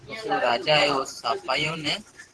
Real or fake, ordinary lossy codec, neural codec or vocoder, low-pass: fake; Opus, 16 kbps; vocoder, 44.1 kHz, 128 mel bands every 512 samples, BigVGAN v2; 10.8 kHz